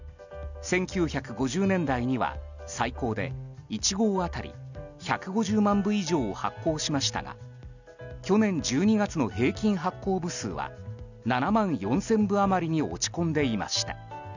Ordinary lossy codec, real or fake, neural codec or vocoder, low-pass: none; real; none; 7.2 kHz